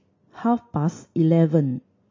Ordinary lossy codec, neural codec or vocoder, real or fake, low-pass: MP3, 32 kbps; none; real; 7.2 kHz